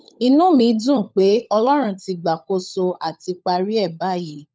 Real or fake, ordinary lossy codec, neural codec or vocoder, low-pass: fake; none; codec, 16 kHz, 16 kbps, FunCodec, trained on LibriTTS, 50 frames a second; none